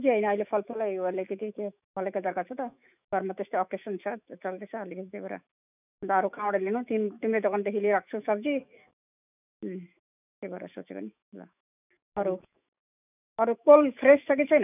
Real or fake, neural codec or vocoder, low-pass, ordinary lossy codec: fake; autoencoder, 48 kHz, 128 numbers a frame, DAC-VAE, trained on Japanese speech; 3.6 kHz; none